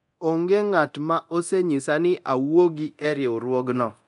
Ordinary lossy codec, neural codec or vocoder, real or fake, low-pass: none; codec, 24 kHz, 0.9 kbps, DualCodec; fake; 10.8 kHz